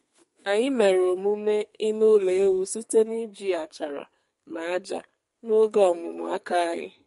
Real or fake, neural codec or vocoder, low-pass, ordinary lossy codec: fake; codec, 44.1 kHz, 2.6 kbps, SNAC; 14.4 kHz; MP3, 48 kbps